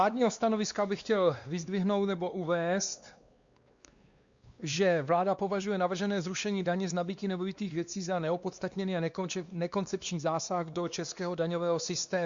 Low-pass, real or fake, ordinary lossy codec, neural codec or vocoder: 7.2 kHz; fake; Opus, 64 kbps; codec, 16 kHz, 2 kbps, X-Codec, WavLM features, trained on Multilingual LibriSpeech